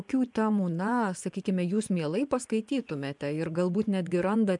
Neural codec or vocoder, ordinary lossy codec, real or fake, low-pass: vocoder, 24 kHz, 100 mel bands, Vocos; MP3, 96 kbps; fake; 10.8 kHz